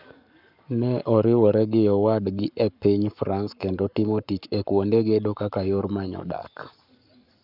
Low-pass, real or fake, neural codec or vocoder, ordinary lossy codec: 5.4 kHz; fake; codec, 44.1 kHz, 7.8 kbps, Pupu-Codec; none